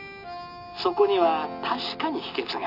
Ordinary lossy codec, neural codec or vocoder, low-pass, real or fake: none; none; 5.4 kHz; real